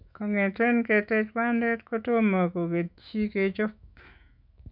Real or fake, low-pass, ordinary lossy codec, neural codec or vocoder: fake; 5.4 kHz; none; autoencoder, 48 kHz, 128 numbers a frame, DAC-VAE, trained on Japanese speech